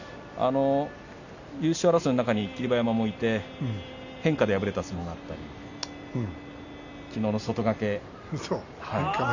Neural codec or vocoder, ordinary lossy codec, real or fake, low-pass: none; none; real; 7.2 kHz